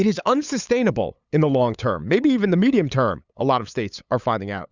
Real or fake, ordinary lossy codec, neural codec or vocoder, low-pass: fake; Opus, 64 kbps; codec, 16 kHz, 8 kbps, FunCodec, trained on LibriTTS, 25 frames a second; 7.2 kHz